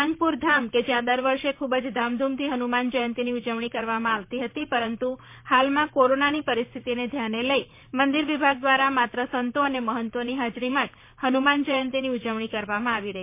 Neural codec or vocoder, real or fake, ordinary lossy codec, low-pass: vocoder, 44.1 kHz, 128 mel bands, Pupu-Vocoder; fake; MP3, 24 kbps; 3.6 kHz